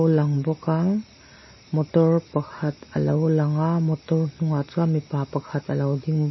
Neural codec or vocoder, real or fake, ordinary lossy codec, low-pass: none; real; MP3, 24 kbps; 7.2 kHz